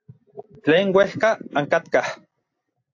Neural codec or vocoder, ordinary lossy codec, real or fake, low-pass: none; AAC, 48 kbps; real; 7.2 kHz